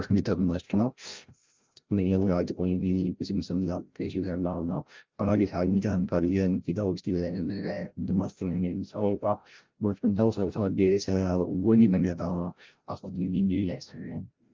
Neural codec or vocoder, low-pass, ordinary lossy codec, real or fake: codec, 16 kHz, 0.5 kbps, FreqCodec, larger model; 7.2 kHz; Opus, 24 kbps; fake